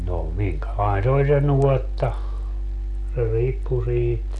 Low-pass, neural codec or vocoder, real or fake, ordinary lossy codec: 10.8 kHz; none; real; none